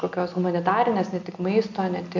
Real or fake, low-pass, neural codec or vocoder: real; 7.2 kHz; none